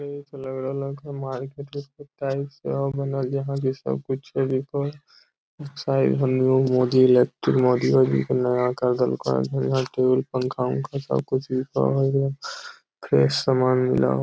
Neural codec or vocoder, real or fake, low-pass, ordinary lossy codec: none; real; none; none